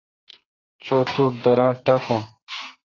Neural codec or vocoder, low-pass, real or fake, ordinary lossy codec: codec, 32 kHz, 1.9 kbps, SNAC; 7.2 kHz; fake; AAC, 32 kbps